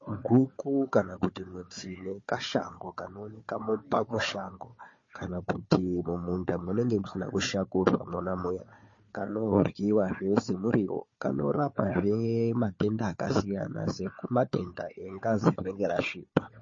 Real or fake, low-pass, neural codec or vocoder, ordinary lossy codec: fake; 7.2 kHz; codec, 16 kHz, 4 kbps, FunCodec, trained on Chinese and English, 50 frames a second; MP3, 32 kbps